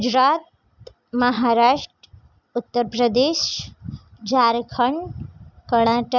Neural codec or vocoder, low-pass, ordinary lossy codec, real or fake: none; 7.2 kHz; none; real